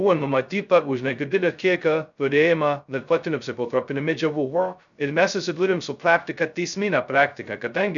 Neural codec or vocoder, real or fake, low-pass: codec, 16 kHz, 0.2 kbps, FocalCodec; fake; 7.2 kHz